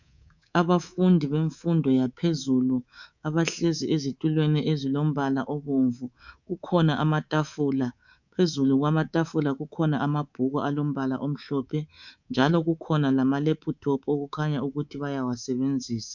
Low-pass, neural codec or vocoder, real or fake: 7.2 kHz; codec, 24 kHz, 3.1 kbps, DualCodec; fake